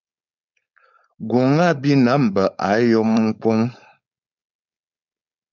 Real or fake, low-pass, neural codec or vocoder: fake; 7.2 kHz; codec, 16 kHz, 4.8 kbps, FACodec